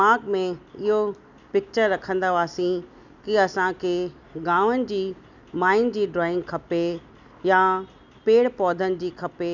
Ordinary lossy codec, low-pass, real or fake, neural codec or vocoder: none; 7.2 kHz; real; none